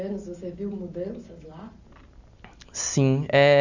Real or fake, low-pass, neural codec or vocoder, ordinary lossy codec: real; 7.2 kHz; none; none